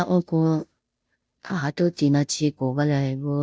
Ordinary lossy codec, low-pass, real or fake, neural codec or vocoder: none; none; fake; codec, 16 kHz, 0.5 kbps, FunCodec, trained on Chinese and English, 25 frames a second